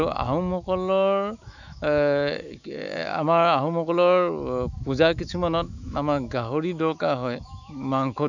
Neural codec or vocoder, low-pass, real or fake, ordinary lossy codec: autoencoder, 48 kHz, 128 numbers a frame, DAC-VAE, trained on Japanese speech; 7.2 kHz; fake; none